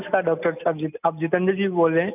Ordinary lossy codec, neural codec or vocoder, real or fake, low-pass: none; none; real; 3.6 kHz